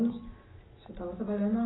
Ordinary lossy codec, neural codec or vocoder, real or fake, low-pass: AAC, 16 kbps; none; real; 7.2 kHz